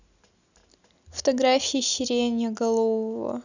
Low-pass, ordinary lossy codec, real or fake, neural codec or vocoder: 7.2 kHz; none; real; none